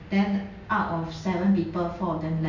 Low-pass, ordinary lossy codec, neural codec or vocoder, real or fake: 7.2 kHz; none; none; real